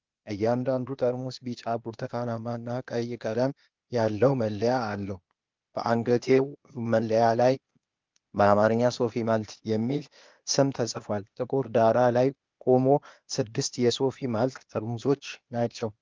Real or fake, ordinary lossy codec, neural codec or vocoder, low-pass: fake; Opus, 24 kbps; codec, 16 kHz, 0.8 kbps, ZipCodec; 7.2 kHz